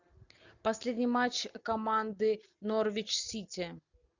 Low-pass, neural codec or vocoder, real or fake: 7.2 kHz; none; real